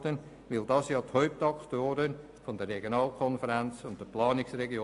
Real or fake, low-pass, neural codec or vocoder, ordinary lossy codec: real; 10.8 kHz; none; AAC, 64 kbps